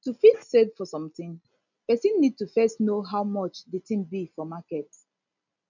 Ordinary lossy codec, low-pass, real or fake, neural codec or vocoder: none; 7.2 kHz; real; none